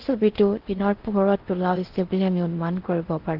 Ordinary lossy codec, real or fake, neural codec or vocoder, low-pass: Opus, 16 kbps; fake; codec, 16 kHz in and 24 kHz out, 0.6 kbps, FocalCodec, streaming, 4096 codes; 5.4 kHz